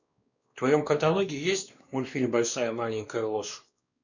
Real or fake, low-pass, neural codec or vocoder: fake; 7.2 kHz; codec, 16 kHz, 2 kbps, X-Codec, WavLM features, trained on Multilingual LibriSpeech